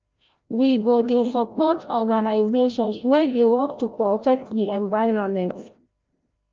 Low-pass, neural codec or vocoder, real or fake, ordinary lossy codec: 7.2 kHz; codec, 16 kHz, 0.5 kbps, FreqCodec, larger model; fake; Opus, 24 kbps